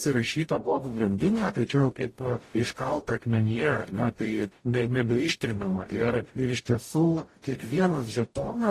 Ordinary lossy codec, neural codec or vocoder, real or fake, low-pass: AAC, 48 kbps; codec, 44.1 kHz, 0.9 kbps, DAC; fake; 14.4 kHz